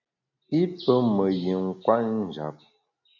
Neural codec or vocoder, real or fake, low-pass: none; real; 7.2 kHz